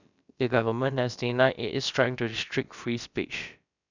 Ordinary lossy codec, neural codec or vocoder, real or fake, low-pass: none; codec, 16 kHz, about 1 kbps, DyCAST, with the encoder's durations; fake; 7.2 kHz